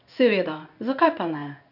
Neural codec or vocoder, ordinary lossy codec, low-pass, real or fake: codec, 16 kHz in and 24 kHz out, 1 kbps, XY-Tokenizer; none; 5.4 kHz; fake